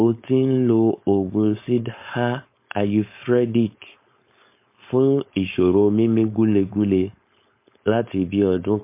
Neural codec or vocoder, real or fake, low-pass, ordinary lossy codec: codec, 16 kHz, 4.8 kbps, FACodec; fake; 3.6 kHz; MP3, 24 kbps